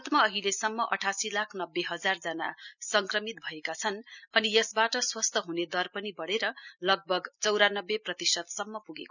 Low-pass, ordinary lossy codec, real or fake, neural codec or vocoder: 7.2 kHz; none; real; none